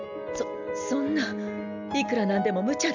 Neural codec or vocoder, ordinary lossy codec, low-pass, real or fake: none; none; 7.2 kHz; real